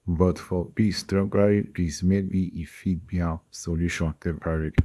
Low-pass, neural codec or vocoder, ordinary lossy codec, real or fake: none; codec, 24 kHz, 0.9 kbps, WavTokenizer, small release; none; fake